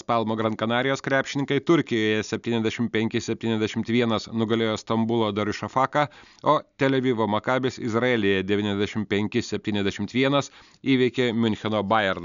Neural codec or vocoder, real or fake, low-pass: none; real; 7.2 kHz